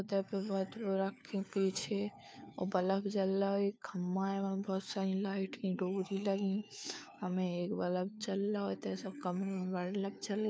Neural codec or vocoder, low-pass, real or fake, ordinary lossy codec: codec, 16 kHz, 4 kbps, FunCodec, trained on LibriTTS, 50 frames a second; none; fake; none